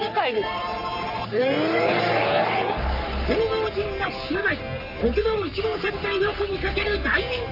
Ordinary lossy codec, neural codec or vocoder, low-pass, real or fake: none; codec, 44.1 kHz, 3.4 kbps, Pupu-Codec; 5.4 kHz; fake